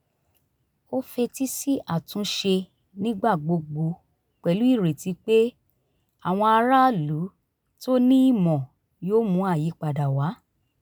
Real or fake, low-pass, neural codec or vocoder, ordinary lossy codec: real; none; none; none